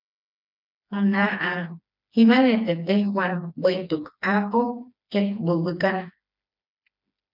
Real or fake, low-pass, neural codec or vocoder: fake; 5.4 kHz; codec, 16 kHz, 2 kbps, FreqCodec, smaller model